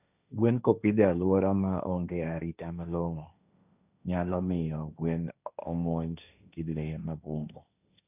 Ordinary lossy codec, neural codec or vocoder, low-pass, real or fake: none; codec, 16 kHz, 1.1 kbps, Voila-Tokenizer; 3.6 kHz; fake